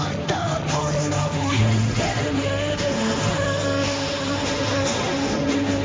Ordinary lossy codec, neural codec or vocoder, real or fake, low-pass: none; codec, 16 kHz, 1.1 kbps, Voila-Tokenizer; fake; none